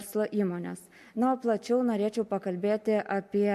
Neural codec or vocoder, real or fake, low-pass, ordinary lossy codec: none; real; 14.4 kHz; MP3, 64 kbps